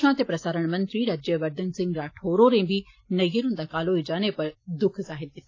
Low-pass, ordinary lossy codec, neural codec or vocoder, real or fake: 7.2 kHz; AAC, 32 kbps; none; real